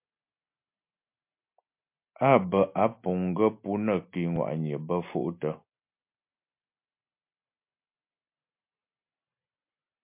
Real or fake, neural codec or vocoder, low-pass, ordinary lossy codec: real; none; 3.6 kHz; MP3, 32 kbps